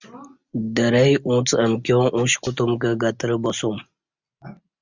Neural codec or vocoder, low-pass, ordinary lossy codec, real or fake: none; 7.2 kHz; Opus, 64 kbps; real